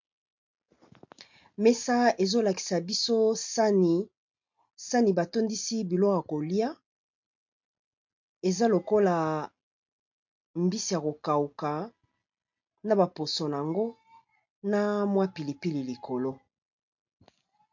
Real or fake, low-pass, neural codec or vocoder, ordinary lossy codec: real; 7.2 kHz; none; MP3, 48 kbps